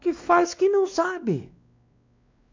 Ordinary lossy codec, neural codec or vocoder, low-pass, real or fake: MP3, 64 kbps; codec, 16 kHz, 2 kbps, X-Codec, WavLM features, trained on Multilingual LibriSpeech; 7.2 kHz; fake